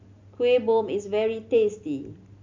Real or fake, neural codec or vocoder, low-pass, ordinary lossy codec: real; none; 7.2 kHz; MP3, 64 kbps